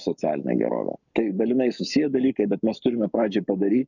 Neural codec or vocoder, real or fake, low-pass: codec, 44.1 kHz, 7.8 kbps, Pupu-Codec; fake; 7.2 kHz